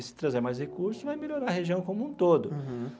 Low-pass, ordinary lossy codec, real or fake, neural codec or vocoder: none; none; real; none